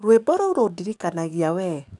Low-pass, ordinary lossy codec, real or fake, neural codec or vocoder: 10.8 kHz; none; fake; codec, 44.1 kHz, 7.8 kbps, DAC